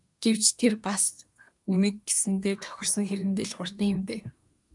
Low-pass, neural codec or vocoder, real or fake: 10.8 kHz; codec, 24 kHz, 1 kbps, SNAC; fake